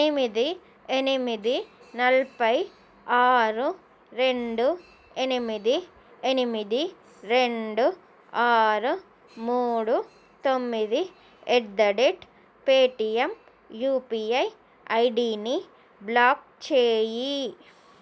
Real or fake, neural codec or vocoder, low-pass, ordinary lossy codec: real; none; none; none